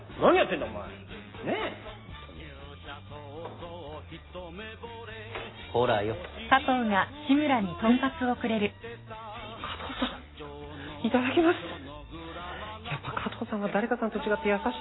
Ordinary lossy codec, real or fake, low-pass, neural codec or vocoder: AAC, 16 kbps; real; 7.2 kHz; none